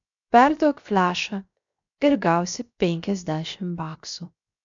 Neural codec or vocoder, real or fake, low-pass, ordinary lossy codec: codec, 16 kHz, 0.7 kbps, FocalCodec; fake; 7.2 kHz; MP3, 64 kbps